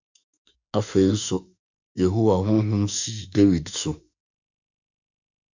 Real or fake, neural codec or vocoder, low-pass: fake; autoencoder, 48 kHz, 32 numbers a frame, DAC-VAE, trained on Japanese speech; 7.2 kHz